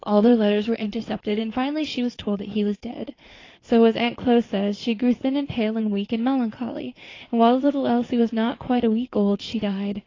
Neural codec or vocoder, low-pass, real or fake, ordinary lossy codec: codec, 16 kHz in and 24 kHz out, 2.2 kbps, FireRedTTS-2 codec; 7.2 kHz; fake; AAC, 32 kbps